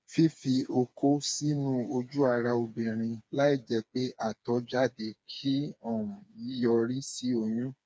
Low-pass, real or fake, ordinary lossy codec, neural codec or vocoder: none; fake; none; codec, 16 kHz, 4 kbps, FreqCodec, smaller model